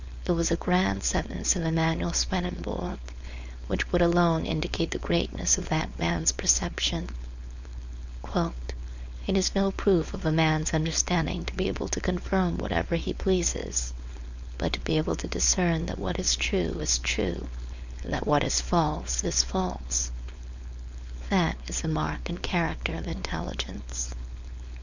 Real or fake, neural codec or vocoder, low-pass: fake; codec, 16 kHz, 4.8 kbps, FACodec; 7.2 kHz